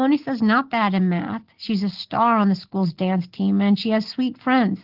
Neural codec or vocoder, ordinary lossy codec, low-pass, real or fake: none; Opus, 16 kbps; 5.4 kHz; real